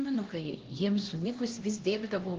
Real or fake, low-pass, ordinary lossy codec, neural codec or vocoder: fake; 7.2 kHz; Opus, 16 kbps; codec, 16 kHz, 1 kbps, X-Codec, HuBERT features, trained on LibriSpeech